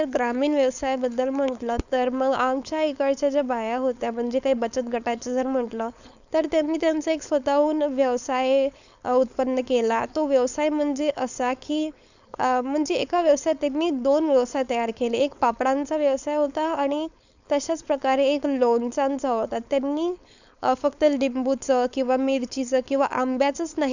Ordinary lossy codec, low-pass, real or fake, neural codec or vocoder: none; 7.2 kHz; fake; codec, 16 kHz, 4.8 kbps, FACodec